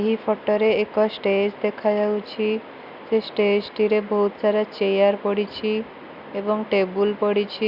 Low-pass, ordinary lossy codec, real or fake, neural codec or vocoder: 5.4 kHz; Opus, 64 kbps; real; none